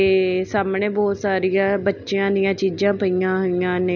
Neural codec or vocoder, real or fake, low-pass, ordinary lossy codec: none; real; 7.2 kHz; none